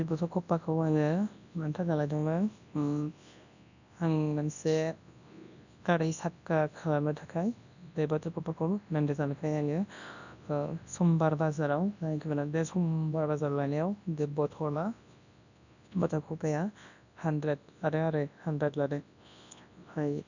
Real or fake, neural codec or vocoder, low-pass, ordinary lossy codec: fake; codec, 24 kHz, 0.9 kbps, WavTokenizer, large speech release; 7.2 kHz; none